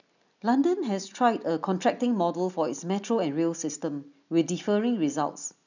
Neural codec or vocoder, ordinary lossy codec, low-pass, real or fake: none; none; 7.2 kHz; real